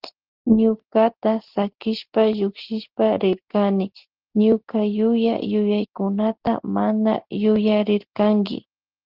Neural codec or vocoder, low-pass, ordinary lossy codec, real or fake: none; 5.4 kHz; Opus, 16 kbps; real